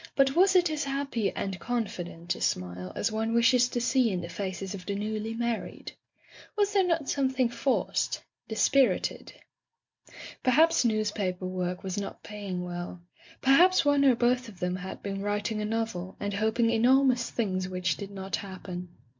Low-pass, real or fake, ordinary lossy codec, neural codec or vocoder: 7.2 kHz; real; MP3, 64 kbps; none